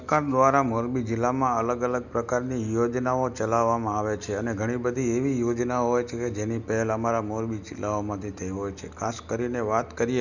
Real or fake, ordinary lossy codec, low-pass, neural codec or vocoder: real; none; 7.2 kHz; none